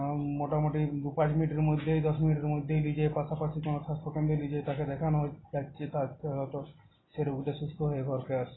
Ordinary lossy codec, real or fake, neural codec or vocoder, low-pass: AAC, 16 kbps; real; none; 7.2 kHz